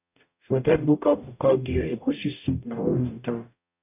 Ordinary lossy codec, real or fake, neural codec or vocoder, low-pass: none; fake; codec, 44.1 kHz, 0.9 kbps, DAC; 3.6 kHz